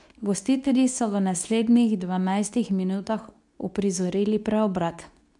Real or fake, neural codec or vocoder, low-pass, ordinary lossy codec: fake; codec, 24 kHz, 0.9 kbps, WavTokenizer, medium speech release version 2; 10.8 kHz; none